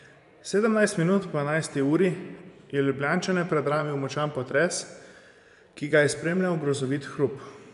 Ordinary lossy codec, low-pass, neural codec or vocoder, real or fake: none; 10.8 kHz; vocoder, 24 kHz, 100 mel bands, Vocos; fake